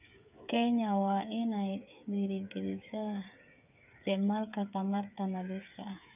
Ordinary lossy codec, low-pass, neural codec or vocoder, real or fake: none; 3.6 kHz; codec, 16 kHz, 8 kbps, FreqCodec, smaller model; fake